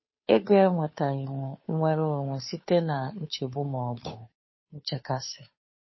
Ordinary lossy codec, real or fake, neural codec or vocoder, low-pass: MP3, 24 kbps; fake; codec, 16 kHz, 2 kbps, FunCodec, trained on Chinese and English, 25 frames a second; 7.2 kHz